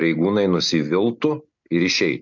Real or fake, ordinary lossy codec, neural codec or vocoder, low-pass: real; MP3, 64 kbps; none; 7.2 kHz